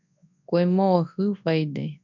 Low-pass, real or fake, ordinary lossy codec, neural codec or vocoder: 7.2 kHz; fake; MP3, 64 kbps; codec, 24 kHz, 0.9 kbps, WavTokenizer, large speech release